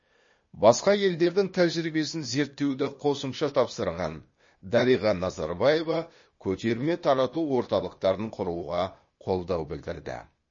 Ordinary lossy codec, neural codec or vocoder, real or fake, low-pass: MP3, 32 kbps; codec, 16 kHz, 0.8 kbps, ZipCodec; fake; 7.2 kHz